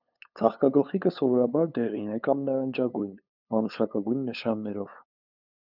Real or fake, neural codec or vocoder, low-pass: fake; codec, 16 kHz, 8 kbps, FunCodec, trained on LibriTTS, 25 frames a second; 5.4 kHz